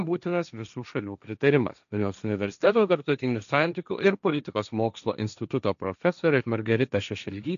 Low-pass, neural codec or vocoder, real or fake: 7.2 kHz; codec, 16 kHz, 1.1 kbps, Voila-Tokenizer; fake